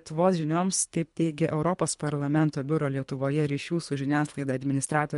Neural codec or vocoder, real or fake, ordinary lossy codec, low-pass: codec, 24 kHz, 3 kbps, HILCodec; fake; MP3, 64 kbps; 10.8 kHz